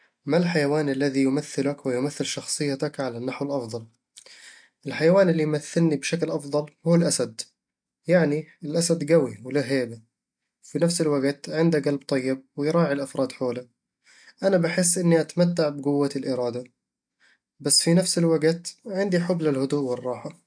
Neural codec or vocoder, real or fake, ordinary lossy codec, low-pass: none; real; MP3, 64 kbps; 9.9 kHz